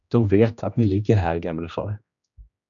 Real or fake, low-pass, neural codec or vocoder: fake; 7.2 kHz; codec, 16 kHz, 1 kbps, X-Codec, HuBERT features, trained on general audio